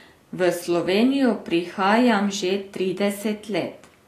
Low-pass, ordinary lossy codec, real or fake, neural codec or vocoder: 14.4 kHz; AAC, 48 kbps; real; none